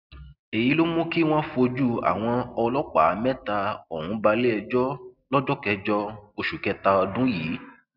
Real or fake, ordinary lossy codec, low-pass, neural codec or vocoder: real; none; 5.4 kHz; none